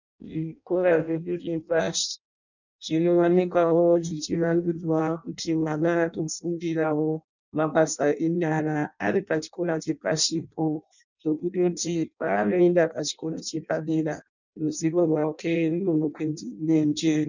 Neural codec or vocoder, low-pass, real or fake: codec, 16 kHz in and 24 kHz out, 0.6 kbps, FireRedTTS-2 codec; 7.2 kHz; fake